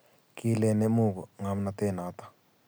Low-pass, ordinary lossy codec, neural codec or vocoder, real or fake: none; none; none; real